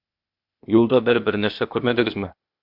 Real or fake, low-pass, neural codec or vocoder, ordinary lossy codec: fake; 5.4 kHz; codec, 16 kHz, 0.8 kbps, ZipCodec; MP3, 48 kbps